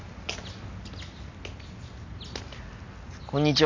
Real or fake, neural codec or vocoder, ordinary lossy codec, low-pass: real; none; MP3, 48 kbps; 7.2 kHz